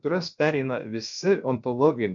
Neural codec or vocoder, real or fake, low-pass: codec, 16 kHz, about 1 kbps, DyCAST, with the encoder's durations; fake; 7.2 kHz